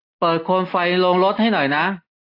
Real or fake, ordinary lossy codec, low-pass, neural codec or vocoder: real; AAC, 32 kbps; 5.4 kHz; none